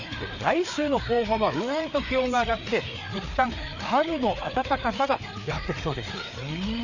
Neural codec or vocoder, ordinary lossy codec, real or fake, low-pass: codec, 16 kHz, 4 kbps, FreqCodec, larger model; none; fake; 7.2 kHz